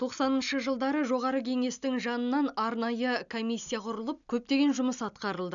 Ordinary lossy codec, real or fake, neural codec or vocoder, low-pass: none; real; none; 7.2 kHz